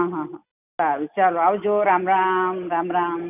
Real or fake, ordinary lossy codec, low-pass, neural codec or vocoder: real; none; 3.6 kHz; none